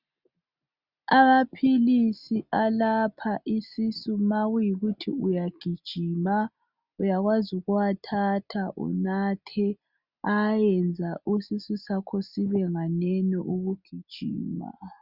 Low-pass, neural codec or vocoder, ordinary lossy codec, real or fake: 5.4 kHz; none; Opus, 64 kbps; real